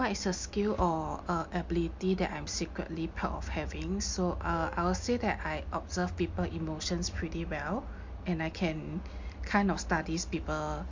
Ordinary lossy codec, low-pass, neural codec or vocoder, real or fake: MP3, 48 kbps; 7.2 kHz; none; real